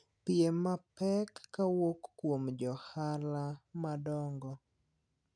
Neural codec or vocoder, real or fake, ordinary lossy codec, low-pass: none; real; none; 9.9 kHz